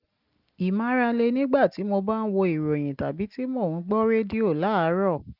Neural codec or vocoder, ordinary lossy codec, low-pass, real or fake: none; Opus, 24 kbps; 5.4 kHz; real